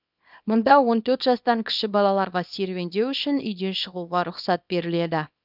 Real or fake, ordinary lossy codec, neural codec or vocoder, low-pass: fake; none; codec, 24 kHz, 0.9 kbps, WavTokenizer, small release; 5.4 kHz